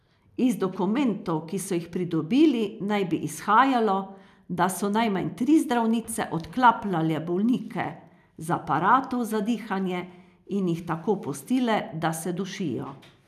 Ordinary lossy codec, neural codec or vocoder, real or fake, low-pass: none; none; real; 14.4 kHz